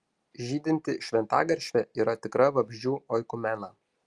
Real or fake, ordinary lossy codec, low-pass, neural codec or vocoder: real; Opus, 32 kbps; 10.8 kHz; none